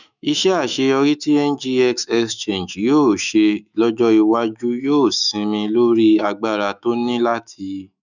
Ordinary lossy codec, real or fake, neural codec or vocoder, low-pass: none; fake; autoencoder, 48 kHz, 128 numbers a frame, DAC-VAE, trained on Japanese speech; 7.2 kHz